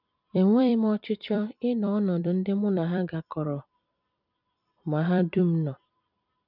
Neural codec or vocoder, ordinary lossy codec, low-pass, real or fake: vocoder, 44.1 kHz, 128 mel bands every 256 samples, BigVGAN v2; none; 5.4 kHz; fake